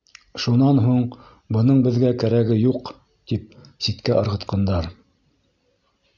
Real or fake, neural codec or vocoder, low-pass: real; none; 7.2 kHz